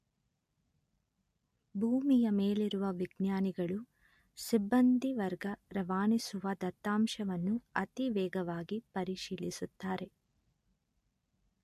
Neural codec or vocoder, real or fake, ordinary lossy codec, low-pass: none; real; MP3, 64 kbps; 14.4 kHz